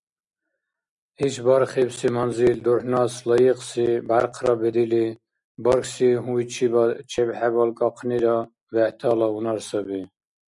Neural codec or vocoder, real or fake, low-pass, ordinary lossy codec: none; real; 10.8 kHz; MP3, 96 kbps